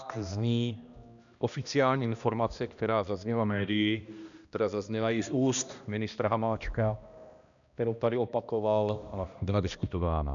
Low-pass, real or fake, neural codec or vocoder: 7.2 kHz; fake; codec, 16 kHz, 1 kbps, X-Codec, HuBERT features, trained on balanced general audio